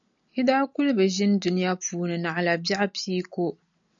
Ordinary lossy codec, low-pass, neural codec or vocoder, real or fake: AAC, 64 kbps; 7.2 kHz; none; real